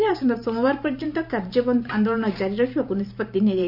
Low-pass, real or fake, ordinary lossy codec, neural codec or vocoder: 5.4 kHz; fake; none; vocoder, 44.1 kHz, 128 mel bands every 512 samples, BigVGAN v2